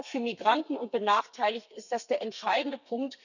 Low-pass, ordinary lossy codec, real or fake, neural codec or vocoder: 7.2 kHz; none; fake; codec, 32 kHz, 1.9 kbps, SNAC